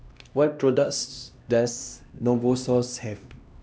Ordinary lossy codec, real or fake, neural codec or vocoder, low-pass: none; fake; codec, 16 kHz, 1 kbps, X-Codec, HuBERT features, trained on LibriSpeech; none